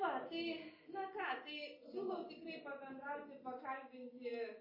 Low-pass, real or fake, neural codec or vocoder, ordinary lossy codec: 5.4 kHz; real; none; MP3, 24 kbps